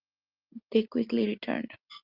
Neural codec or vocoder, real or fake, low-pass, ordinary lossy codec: none; real; 5.4 kHz; Opus, 32 kbps